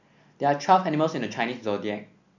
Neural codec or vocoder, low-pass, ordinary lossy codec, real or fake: none; 7.2 kHz; none; real